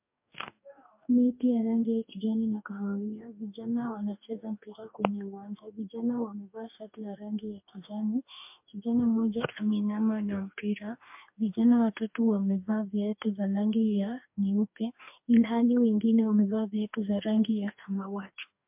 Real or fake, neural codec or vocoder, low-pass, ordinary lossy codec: fake; codec, 44.1 kHz, 2.6 kbps, DAC; 3.6 kHz; MP3, 32 kbps